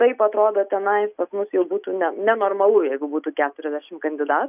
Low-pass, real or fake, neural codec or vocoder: 3.6 kHz; real; none